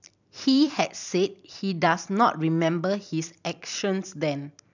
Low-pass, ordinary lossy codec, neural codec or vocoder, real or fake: 7.2 kHz; none; vocoder, 44.1 kHz, 128 mel bands every 256 samples, BigVGAN v2; fake